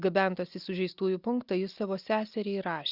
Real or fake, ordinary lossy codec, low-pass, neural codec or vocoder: real; Opus, 64 kbps; 5.4 kHz; none